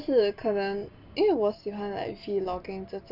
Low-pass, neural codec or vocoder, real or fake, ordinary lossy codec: 5.4 kHz; none; real; none